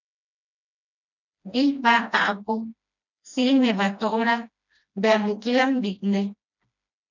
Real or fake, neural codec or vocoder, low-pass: fake; codec, 16 kHz, 1 kbps, FreqCodec, smaller model; 7.2 kHz